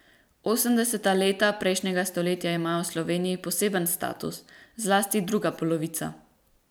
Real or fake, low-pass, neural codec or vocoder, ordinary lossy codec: fake; none; vocoder, 44.1 kHz, 128 mel bands every 256 samples, BigVGAN v2; none